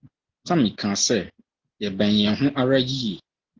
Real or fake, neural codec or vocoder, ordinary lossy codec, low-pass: real; none; Opus, 16 kbps; 7.2 kHz